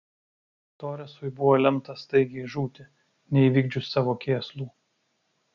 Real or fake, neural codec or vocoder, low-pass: real; none; 7.2 kHz